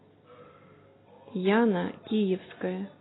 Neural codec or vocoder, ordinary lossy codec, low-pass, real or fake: none; AAC, 16 kbps; 7.2 kHz; real